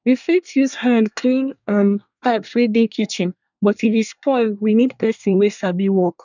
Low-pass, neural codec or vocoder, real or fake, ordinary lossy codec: 7.2 kHz; codec, 24 kHz, 1 kbps, SNAC; fake; none